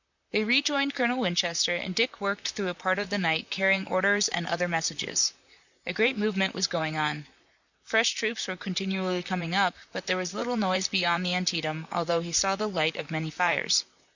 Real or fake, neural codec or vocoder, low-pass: fake; vocoder, 44.1 kHz, 128 mel bands, Pupu-Vocoder; 7.2 kHz